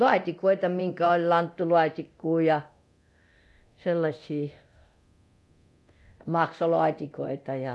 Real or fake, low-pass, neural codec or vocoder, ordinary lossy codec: fake; none; codec, 24 kHz, 0.9 kbps, DualCodec; none